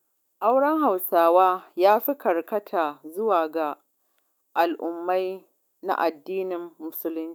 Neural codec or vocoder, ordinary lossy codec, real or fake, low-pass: autoencoder, 48 kHz, 128 numbers a frame, DAC-VAE, trained on Japanese speech; none; fake; none